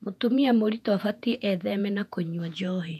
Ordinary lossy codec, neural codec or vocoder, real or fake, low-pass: none; vocoder, 48 kHz, 128 mel bands, Vocos; fake; 14.4 kHz